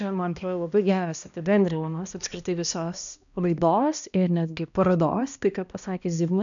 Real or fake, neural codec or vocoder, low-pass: fake; codec, 16 kHz, 1 kbps, X-Codec, HuBERT features, trained on balanced general audio; 7.2 kHz